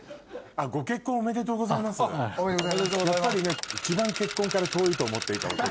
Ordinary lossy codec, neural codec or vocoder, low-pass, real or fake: none; none; none; real